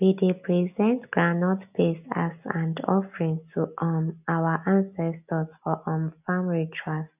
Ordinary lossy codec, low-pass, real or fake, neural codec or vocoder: none; 3.6 kHz; real; none